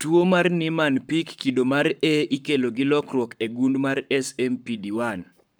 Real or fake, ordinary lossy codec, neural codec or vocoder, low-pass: fake; none; codec, 44.1 kHz, 7.8 kbps, Pupu-Codec; none